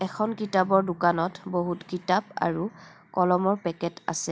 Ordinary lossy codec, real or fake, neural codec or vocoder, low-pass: none; real; none; none